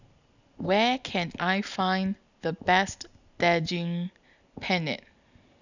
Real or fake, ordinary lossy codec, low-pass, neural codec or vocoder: real; none; 7.2 kHz; none